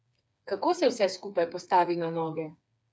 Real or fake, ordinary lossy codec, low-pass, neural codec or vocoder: fake; none; none; codec, 16 kHz, 4 kbps, FreqCodec, smaller model